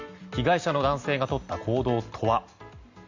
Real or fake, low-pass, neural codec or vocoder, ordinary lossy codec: real; 7.2 kHz; none; Opus, 64 kbps